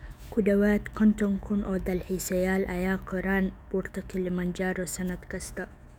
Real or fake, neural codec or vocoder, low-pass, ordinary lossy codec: fake; autoencoder, 48 kHz, 128 numbers a frame, DAC-VAE, trained on Japanese speech; 19.8 kHz; none